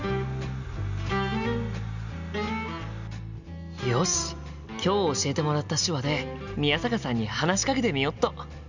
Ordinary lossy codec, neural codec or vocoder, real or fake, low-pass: none; none; real; 7.2 kHz